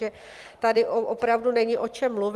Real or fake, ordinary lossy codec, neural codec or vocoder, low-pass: fake; Opus, 32 kbps; vocoder, 44.1 kHz, 128 mel bands every 256 samples, BigVGAN v2; 14.4 kHz